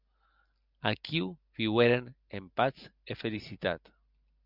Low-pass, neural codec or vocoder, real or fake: 5.4 kHz; none; real